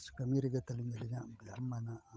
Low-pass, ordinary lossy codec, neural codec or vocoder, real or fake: none; none; codec, 16 kHz, 8 kbps, FunCodec, trained on Chinese and English, 25 frames a second; fake